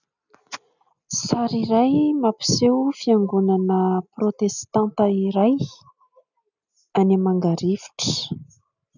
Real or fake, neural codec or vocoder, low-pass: real; none; 7.2 kHz